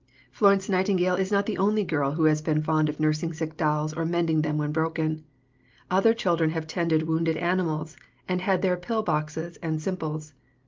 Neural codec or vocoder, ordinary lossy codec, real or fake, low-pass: none; Opus, 32 kbps; real; 7.2 kHz